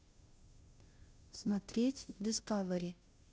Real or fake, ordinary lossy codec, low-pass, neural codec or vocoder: fake; none; none; codec, 16 kHz, 0.5 kbps, FunCodec, trained on Chinese and English, 25 frames a second